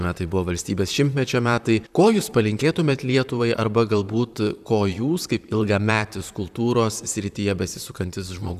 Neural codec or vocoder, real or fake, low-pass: vocoder, 44.1 kHz, 128 mel bands, Pupu-Vocoder; fake; 14.4 kHz